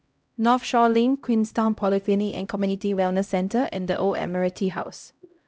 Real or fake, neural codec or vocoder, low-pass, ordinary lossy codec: fake; codec, 16 kHz, 0.5 kbps, X-Codec, HuBERT features, trained on LibriSpeech; none; none